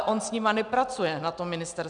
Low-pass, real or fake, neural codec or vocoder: 9.9 kHz; real; none